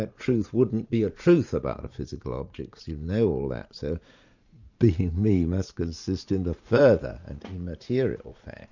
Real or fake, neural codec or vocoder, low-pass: fake; vocoder, 44.1 kHz, 80 mel bands, Vocos; 7.2 kHz